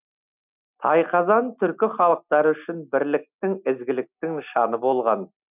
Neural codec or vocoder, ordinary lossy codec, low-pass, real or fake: none; none; 3.6 kHz; real